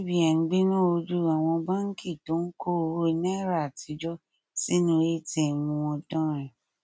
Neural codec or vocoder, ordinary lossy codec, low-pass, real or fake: none; none; none; real